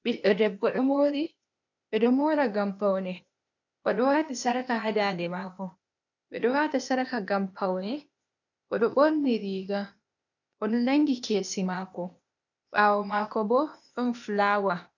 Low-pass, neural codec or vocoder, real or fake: 7.2 kHz; codec, 16 kHz, 0.8 kbps, ZipCodec; fake